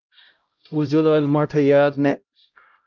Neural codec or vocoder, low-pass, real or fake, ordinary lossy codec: codec, 16 kHz, 0.5 kbps, X-Codec, HuBERT features, trained on LibriSpeech; 7.2 kHz; fake; Opus, 24 kbps